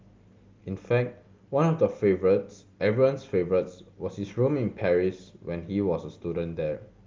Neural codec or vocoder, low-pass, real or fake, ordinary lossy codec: none; 7.2 kHz; real; Opus, 24 kbps